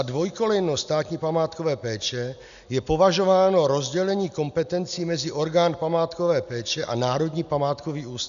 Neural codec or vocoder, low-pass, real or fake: none; 7.2 kHz; real